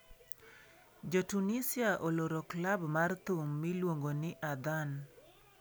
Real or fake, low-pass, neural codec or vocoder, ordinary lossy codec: real; none; none; none